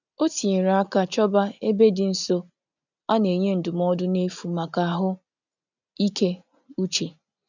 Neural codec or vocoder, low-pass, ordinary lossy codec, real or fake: none; 7.2 kHz; none; real